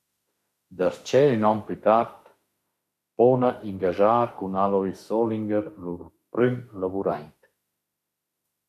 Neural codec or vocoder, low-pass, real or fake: autoencoder, 48 kHz, 32 numbers a frame, DAC-VAE, trained on Japanese speech; 14.4 kHz; fake